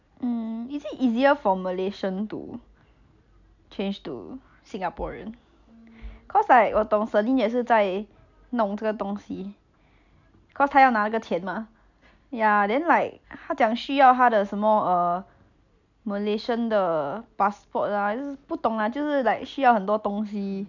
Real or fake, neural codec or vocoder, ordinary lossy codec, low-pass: real; none; none; 7.2 kHz